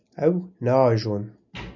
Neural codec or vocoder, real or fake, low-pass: none; real; 7.2 kHz